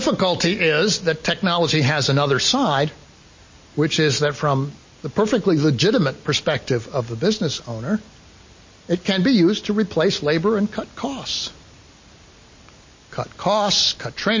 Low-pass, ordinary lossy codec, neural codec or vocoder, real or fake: 7.2 kHz; MP3, 32 kbps; none; real